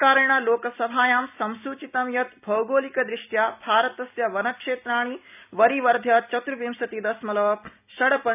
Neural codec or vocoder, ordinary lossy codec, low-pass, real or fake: none; none; 3.6 kHz; real